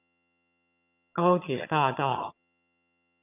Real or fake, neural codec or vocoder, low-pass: fake; vocoder, 22.05 kHz, 80 mel bands, HiFi-GAN; 3.6 kHz